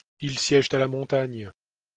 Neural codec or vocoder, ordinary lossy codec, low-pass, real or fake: none; Opus, 24 kbps; 9.9 kHz; real